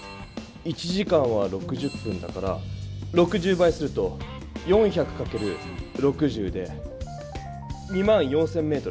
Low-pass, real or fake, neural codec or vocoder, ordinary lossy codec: none; real; none; none